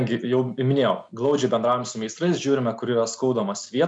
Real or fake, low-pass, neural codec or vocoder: real; 10.8 kHz; none